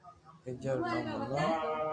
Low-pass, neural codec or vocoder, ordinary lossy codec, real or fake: 9.9 kHz; none; MP3, 48 kbps; real